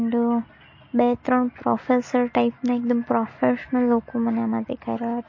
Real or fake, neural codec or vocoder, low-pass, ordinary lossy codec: real; none; 7.2 kHz; MP3, 32 kbps